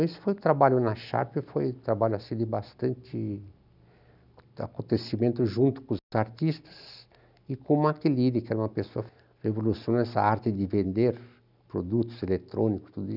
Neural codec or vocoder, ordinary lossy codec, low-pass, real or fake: none; none; 5.4 kHz; real